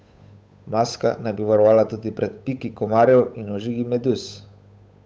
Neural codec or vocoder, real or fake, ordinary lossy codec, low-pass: codec, 16 kHz, 8 kbps, FunCodec, trained on Chinese and English, 25 frames a second; fake; none; none